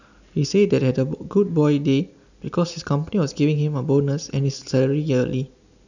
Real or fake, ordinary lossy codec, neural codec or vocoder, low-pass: real; none; none; 7.2 kHz